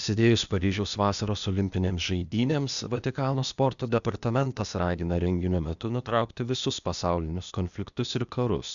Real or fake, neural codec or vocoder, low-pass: fake; codec, 16 kHz, 0.8 kbps, ZipCodec; 7.2 kHz